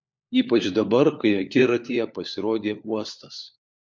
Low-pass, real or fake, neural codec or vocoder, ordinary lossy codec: 7.2 kHz; fake; codec, 16 kHz, 4 kbps, FunCodec, trained on LibriTTS, 50 frames a second; MP3, 64 kbps